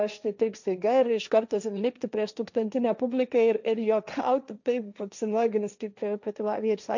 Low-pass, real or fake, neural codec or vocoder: 7.2 kHz; fake; codec, 16 kHz, 1.1 kbps, Voila-Tokenizer